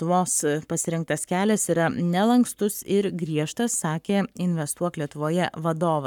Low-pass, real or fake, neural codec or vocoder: 19.8 kHz; fake; codec, 44.1 kHz, 7.8 kbps, Pupu-Codec